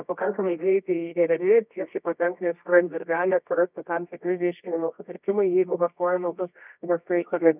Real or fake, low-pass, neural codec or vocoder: fake; 3.6 kHz; codec, 24 kHz, 0.9 kbps, WavTokenizer, medium music audio release